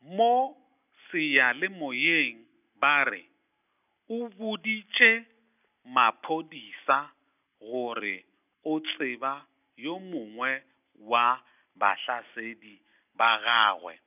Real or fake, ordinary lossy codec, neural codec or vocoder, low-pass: real; none; none; 3.6 kHz